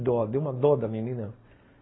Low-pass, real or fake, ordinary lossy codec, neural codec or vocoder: 7.2 kHz; real; AAC, 16 kbps; none